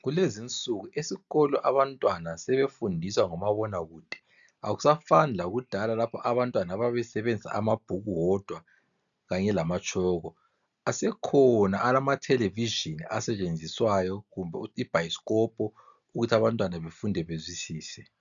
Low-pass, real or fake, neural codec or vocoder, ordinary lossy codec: 7.2 kHz; real; none; MP3, 96 kbps